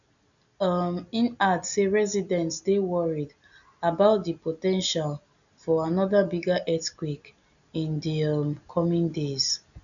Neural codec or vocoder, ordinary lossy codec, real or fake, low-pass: none; none; real; 7.2 kHz